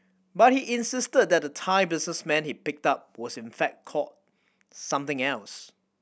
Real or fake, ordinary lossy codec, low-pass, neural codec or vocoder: real; none; none; none